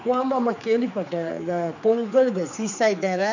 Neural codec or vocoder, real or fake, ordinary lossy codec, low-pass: codec, 16 kHz, 4 kbps, X-Codec, HuBERT features, trained on general audio; fake; none; 7.2 kHz